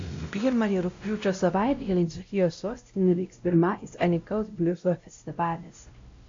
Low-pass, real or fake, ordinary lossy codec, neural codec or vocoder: 7.2 kHz; fake; AAC, 48 kbps; codec, 16 kHz, 0.5 kbps, X-Codec, WavLM features, trained on Multilingual LibriSpeech